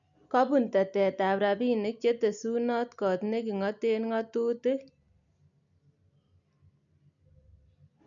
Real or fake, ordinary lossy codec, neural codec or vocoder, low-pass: real; MP3, 96 kbps; none; 7.2 kHz